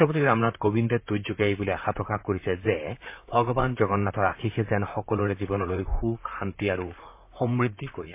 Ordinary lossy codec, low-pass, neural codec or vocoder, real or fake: MP3, 24 kbps; 3.6 kHz; vocoder, 44.1 kHz, 128 mel bands, Pupu-Vocoder; fake